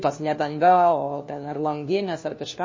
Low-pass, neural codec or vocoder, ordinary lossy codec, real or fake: 7.2 kHz; codec, 16 kHz, 1 kbps, FunCodec, trained on LibriTTS, 50 frames a second; MP3, 32 kbps; fake